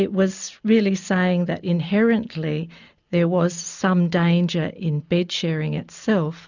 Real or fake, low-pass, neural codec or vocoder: real; 7.2 kHz; none